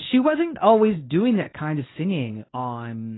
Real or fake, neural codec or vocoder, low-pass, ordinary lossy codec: fake; codec, 24 kHz, 0.9 kbps, WavTokenizer, medium speech release version 1; 7.2 kHz; AAC, 16 kbps